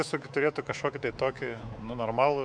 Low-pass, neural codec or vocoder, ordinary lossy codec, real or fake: 9.9 kHz; codec, 24 kHz, 3.1 kbps, DualCodec; MP3, 96 kbps; fake